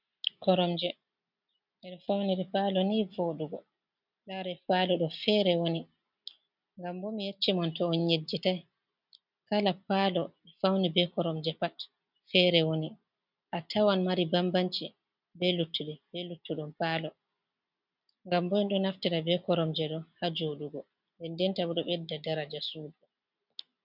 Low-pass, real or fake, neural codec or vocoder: 5.4 kHz; real; none